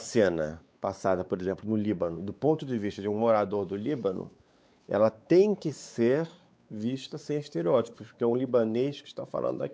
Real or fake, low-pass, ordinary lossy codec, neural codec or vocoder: fake; none; none; codec, 16 kHz, 4 kbps, X-Codec, WavLM features, trained on Multilingual LibriSpeech